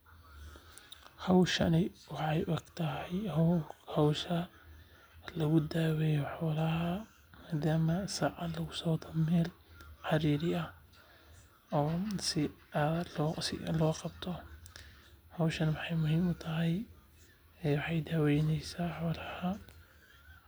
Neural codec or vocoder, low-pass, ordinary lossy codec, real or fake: none; none; none; real